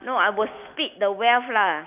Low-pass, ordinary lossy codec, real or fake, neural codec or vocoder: 3.6 kHz; none; real; none